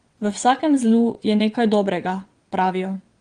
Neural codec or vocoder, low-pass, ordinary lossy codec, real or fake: vocoder, 22.05 kHz, 80 mel bands, WaveNeXt; 9.9 kHz; Opus, 24 kbps; fake